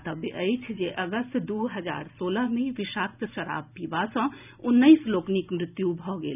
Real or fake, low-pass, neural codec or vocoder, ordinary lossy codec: real; 3.6 kHz; none; none